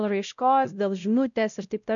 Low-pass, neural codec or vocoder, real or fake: 7.2 kHz; codec, 16 kHz, 0.5 kbps, X-Codec, WavLM features, trained on Multilingual LibriSpeech; fake